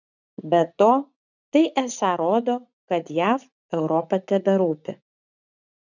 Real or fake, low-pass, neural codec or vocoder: fake; 7.2 kHz; vocoder, 44.1 kHz, 80 mel bands, Vocos